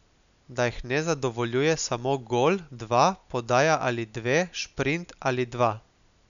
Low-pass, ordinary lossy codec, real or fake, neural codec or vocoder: 7.2 kHz; none; real; none